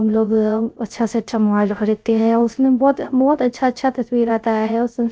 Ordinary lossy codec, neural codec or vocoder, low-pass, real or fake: none; codec, 16 kHz, 0.3 kbps, FocalCodec; none; fake